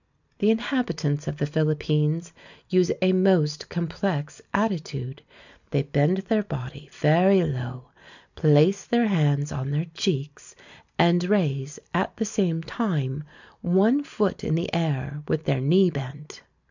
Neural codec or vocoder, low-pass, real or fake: none; 7.2 kHz; real